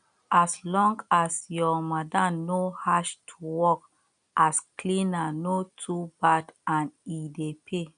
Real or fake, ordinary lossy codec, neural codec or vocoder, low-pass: real; MP3, 96 kbps; none; 9.9 kHz